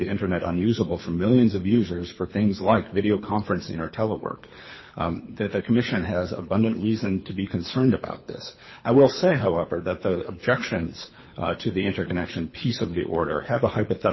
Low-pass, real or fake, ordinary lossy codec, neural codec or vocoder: 7.2 kHz; fake; MP3, 24 kbps; codec, 24 kHz, 6 kbps, HILCodec